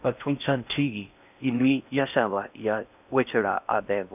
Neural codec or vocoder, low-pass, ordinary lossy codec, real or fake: codec, 16 kHz in and 24 kHz out, 0.6 kbps, FocalCodec, streaming, 4096 codes; 3.6 kHz; none; fake